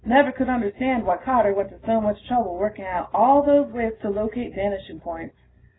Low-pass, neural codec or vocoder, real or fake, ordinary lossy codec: 7.2 kHz; none; real; AAC, 16 kbps